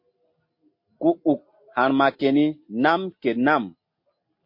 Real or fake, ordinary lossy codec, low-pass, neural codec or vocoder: real; MP3, 32 kbps; 5.4 kHz; none